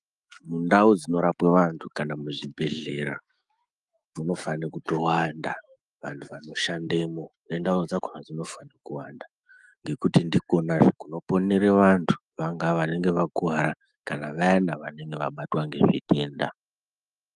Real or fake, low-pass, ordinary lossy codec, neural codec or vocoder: fake; 10.8 kHz; Opus, 32 kbps; autoencoder, 48 kHz, 128 numbers a frame, DAC-VAE, trained on Japanese speech